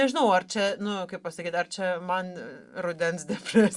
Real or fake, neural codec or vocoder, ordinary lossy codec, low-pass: real; none; Opus, 64 kbps; 10.8 kHz